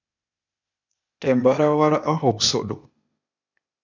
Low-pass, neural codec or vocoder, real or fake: 7.2 kHz; codec, 16 kHz, 0.8 kbps, ZipCodec; fake